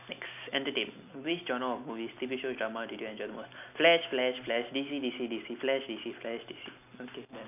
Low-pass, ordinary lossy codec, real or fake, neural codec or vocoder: 3.6 kHz; none; real; none